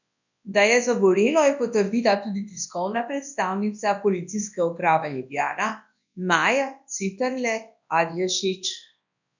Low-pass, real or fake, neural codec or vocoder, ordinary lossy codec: 7.2 kHz; fake; codec, 24 kHz, 0.9 kbps, WavTokenizer, large speech release; none